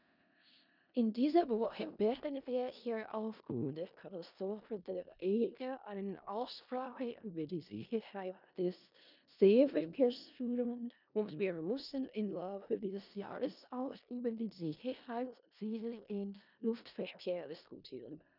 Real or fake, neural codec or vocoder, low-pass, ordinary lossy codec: fake; codec, 16 kHz in and 24 kHz out, 0.4 kbps, LongCat-Audio-Codec, four codebook decoder; 5.4 kHz; none